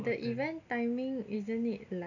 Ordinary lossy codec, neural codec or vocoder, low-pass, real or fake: none; none; 7.2 kHz; real